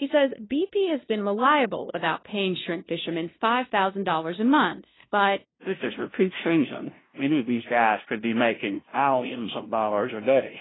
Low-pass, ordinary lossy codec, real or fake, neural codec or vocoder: 7.2 kHz; AAC, 16 kbps; fake; codec, 16 kHz, 0.5 kbps, FunCodec, trained on LibriTTS, 25 frames a second